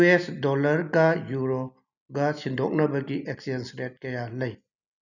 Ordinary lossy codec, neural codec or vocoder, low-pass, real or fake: none; none; 7.2 kHz; real